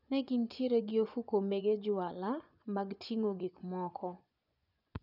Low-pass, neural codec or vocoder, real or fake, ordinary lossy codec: 5.4 kHz; vocoder, 24 kHz, 100 mel bands, Vocos; fake; none